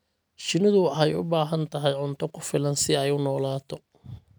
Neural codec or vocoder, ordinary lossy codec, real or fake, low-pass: none; none; real; none